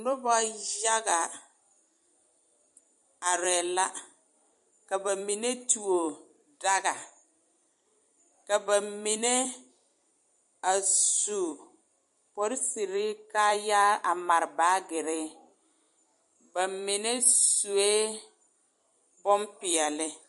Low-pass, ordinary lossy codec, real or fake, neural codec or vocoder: 14.4 kHz; MP3, 48 kbps; fake; vocoder, 44.1 kHz, 128 mel bands every 256 samples, BigVGAN v2